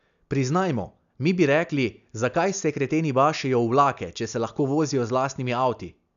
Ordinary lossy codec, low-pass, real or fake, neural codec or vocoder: none; 7.2 kHz; real; none